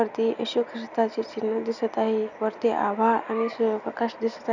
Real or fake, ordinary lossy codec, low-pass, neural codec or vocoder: real; none; 7.2 kHz; none